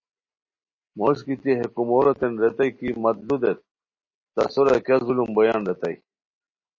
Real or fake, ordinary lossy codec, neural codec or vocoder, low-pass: real; MP3, 32 kbps; none; 7.2 kHz